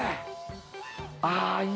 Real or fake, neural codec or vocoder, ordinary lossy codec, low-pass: real; none; none; none